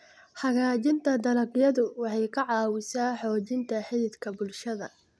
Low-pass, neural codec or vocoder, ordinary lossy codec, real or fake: none; none; none; real